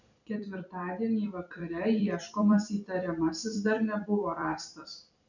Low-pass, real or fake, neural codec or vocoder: 7.2 kHz; fake; vocoder, 44.1 kHz, 128 mel bands every 256 samples, BigVGAN v2